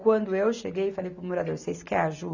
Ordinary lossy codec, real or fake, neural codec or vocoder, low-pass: none; real; none; 7.2 kHz